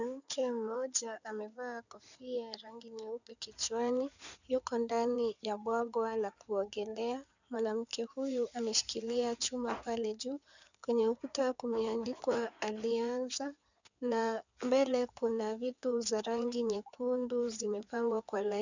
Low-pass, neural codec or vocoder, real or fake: 7.2 kHz; codec, 16 kHz in and 24 kHz out, 2.2 kbps, FireRedTTS-2 codec; fake